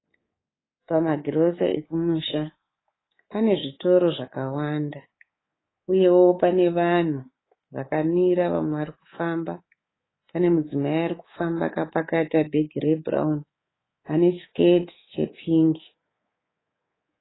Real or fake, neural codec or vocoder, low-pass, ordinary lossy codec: fake; codec, 24 kHz, 3.1 kbps, DualCodec; 7.2 kHz; AAC, 16 kbps